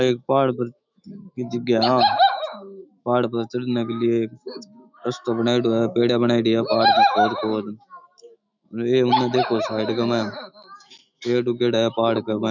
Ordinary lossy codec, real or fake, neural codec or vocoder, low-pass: none; fake; autoencoder, 48 kHz, 128 numbers a frame, DAC-VAE, trained on Japanese speech; 7.2 kHz